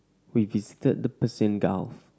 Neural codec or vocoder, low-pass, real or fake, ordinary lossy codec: none; none; real; none